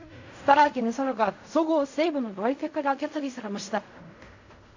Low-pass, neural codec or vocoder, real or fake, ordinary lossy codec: 7.2 kHz; codec, 16 kHz in and 24 kHz out, 0.4 kbps, LongCat-Audio-Codec, fine tuned four codebook decoder; fake; AAC, 48 kbps